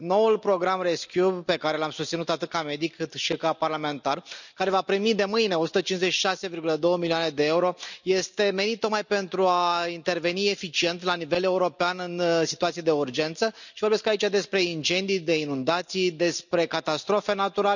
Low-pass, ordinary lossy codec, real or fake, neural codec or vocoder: 7.2 kHz; none; real; none